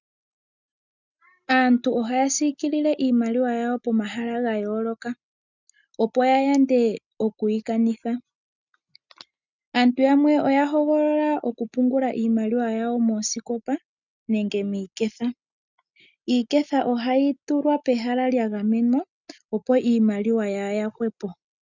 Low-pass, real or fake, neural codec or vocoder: 7.2 kHz; real; none